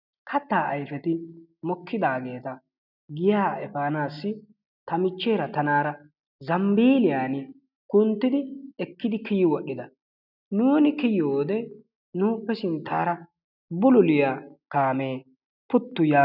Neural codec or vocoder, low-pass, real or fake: none; 5.4 kHz; real